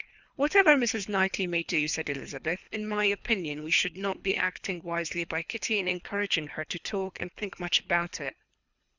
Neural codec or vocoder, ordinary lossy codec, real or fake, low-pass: codec, 24 kHz, 3 kbps, HILCodec; Opus, 64 kbps; fake; 7.2 kHz